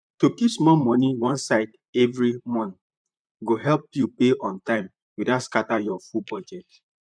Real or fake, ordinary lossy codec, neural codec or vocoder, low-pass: fake; none; vocoder, 44.1 kHz, 128 mel bands, Pupu-Vocoder; 9.9 kHz